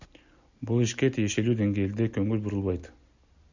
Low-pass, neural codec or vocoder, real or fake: 7.2 kHz; none; real